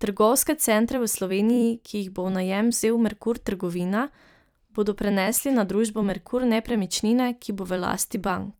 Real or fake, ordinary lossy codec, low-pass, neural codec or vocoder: fake; none; none; vocoder, 44.1 kHz, 128 mel bands every 256 samples, BigVGAN v2